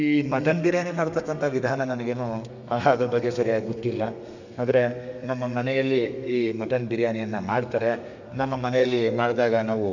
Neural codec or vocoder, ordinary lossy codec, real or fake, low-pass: codec, 32 kHz, 1.9 kbps, SNAC; none; fake; 7.2 kHz